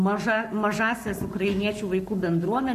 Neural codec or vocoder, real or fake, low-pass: codec, 44.1 kHz, 7.8 kbps, Pupu-Codec; fake; 14.4 kHz